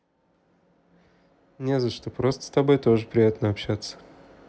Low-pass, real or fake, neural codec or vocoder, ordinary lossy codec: none; real; none; none